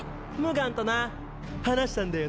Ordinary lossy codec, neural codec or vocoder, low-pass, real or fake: none; none; none; real